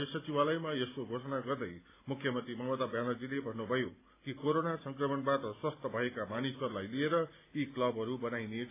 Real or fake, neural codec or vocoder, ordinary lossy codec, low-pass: real; none; AAC, 24 kbps; 3.6 kHz